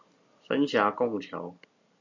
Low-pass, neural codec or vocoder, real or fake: 7.2 kHz; none; real